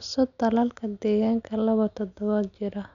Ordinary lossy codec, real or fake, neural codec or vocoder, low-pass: none; real; none; 7.2 kHz